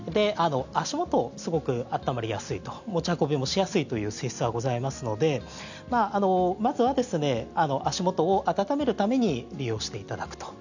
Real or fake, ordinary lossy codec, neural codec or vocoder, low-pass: real; none; none; 7.2 kHz